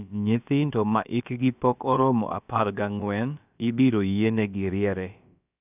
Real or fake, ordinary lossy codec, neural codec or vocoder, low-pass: fake; none; codec, 16 kHz, about 1 kbps, DyCAST, with the encoder's durations; 3.6 kHz